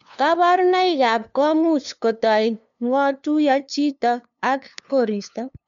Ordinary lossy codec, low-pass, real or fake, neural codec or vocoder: MP3, 64 kbps; 7.2 kHz; fake; codec, 16 kHz, 2 kbps, FunCodec, trained on LibriTTS, 25 frames a second